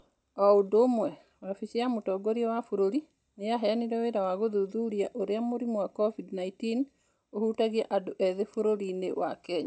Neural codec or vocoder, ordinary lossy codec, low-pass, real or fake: none; none; none; real